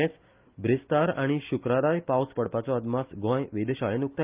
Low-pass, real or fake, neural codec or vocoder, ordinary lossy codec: 3.6 kHz; real; none; Opus, 32 kbps